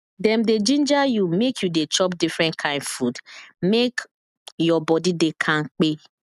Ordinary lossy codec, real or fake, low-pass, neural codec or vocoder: none; real; 14.4 kHz; none